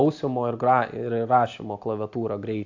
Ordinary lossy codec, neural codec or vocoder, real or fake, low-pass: AAC, 48 kbps; none; real; 7.2 kHz